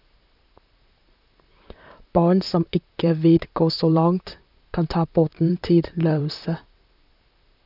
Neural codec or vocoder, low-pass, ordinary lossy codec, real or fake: vocoder, 44.1 kHz, 128 mel bands, Pupu-Vocoder; 5.4 kHz; none; fake